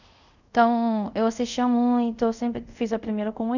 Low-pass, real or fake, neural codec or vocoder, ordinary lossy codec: 7.2 kHz; fake; codec, 24 kHz, 0.5 kbps, DualCodec; none